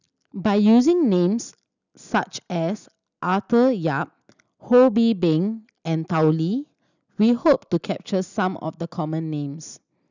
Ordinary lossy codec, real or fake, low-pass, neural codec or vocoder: none; real; 7.2 kHz; none